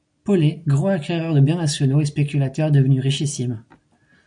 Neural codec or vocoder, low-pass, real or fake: none; 9.9 kHz; real